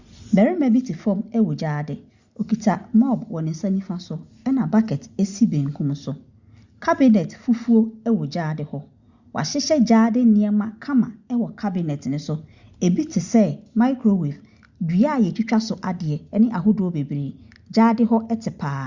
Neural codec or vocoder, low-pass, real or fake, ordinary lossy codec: none; 7.2 kHz; real; Opus, 64 kbps